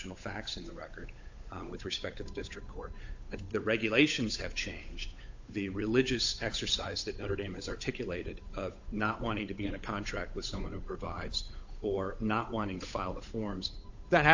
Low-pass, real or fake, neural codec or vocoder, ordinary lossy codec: 7.2 kHz; fake; codec, 16 kHz, 8 kbps, FunCodec, trained on Chinese and English, 25 frames a second; AAC, 48 kbps